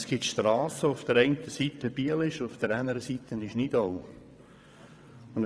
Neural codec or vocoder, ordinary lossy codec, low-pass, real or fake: vocoder, 22.05 kHz, 80 mel bands, WaveNeXt; none; none; fake